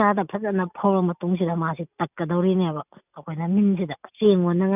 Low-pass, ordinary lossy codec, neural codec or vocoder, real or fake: 3.6 kHz; none; none; real